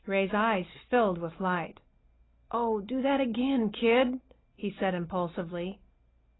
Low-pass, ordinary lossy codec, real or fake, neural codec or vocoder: 7.2 kHz; AAC, 16 kbps; real; none